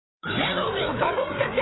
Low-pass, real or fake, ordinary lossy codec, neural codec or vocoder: 7.2 kHz; fake; AAC, 16 kbps; codec, 16 kHz, 4 kbps, FreqCodec, larger model